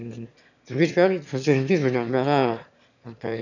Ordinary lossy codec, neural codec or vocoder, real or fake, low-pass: none; autoencoder, 22.05 kHz, a latent of 192 numbers a frame, VITS, trained on one speaker; fake; 7.2 kHz